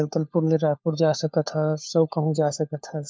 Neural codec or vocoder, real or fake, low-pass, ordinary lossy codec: codec, 16 kHz, 4 kbps, FunCodec, trained on LibriTTS, 50 frames a second; fake; none; none